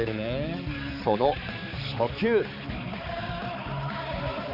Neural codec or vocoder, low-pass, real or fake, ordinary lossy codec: codec, 16 kHz, 4 kbps, X-Codec, HuBERT features, trained on balanced general audio; 5.4 kHz; fake; none